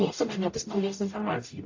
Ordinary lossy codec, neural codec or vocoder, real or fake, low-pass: AAC, 48 kbps; codec, 44.1 kHz, 0.9 kbps, DAC; fake; 7.2 kHz